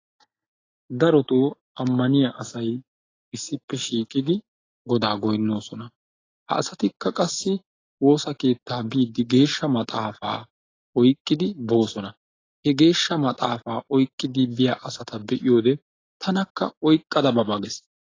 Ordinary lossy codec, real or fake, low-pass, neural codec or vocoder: AAC, 32 kbps; real; 7.2 kHz; none